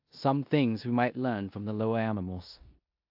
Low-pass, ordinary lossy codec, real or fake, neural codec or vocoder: 5.4 kHz; AAC, 48 kbps; fake; codec, 16 kHz in and 24 kHz out, 0.9 kbps, LongCat-Audio-Codec, four codebook decoder